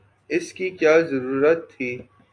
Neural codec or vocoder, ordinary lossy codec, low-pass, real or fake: none; AAC, 48 kbps; 9.9 kHz; real